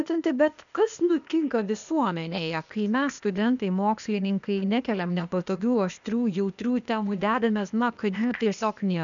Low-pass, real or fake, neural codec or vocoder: 7.2 kHz; fake; codec, 16 kHz, 0.8 kbps, ZipCodec